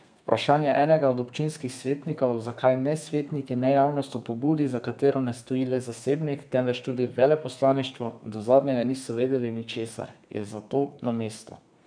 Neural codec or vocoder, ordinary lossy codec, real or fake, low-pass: codec, 32 kHz, 1.9 kbps, SNAC; none; fake; 9.9 kHz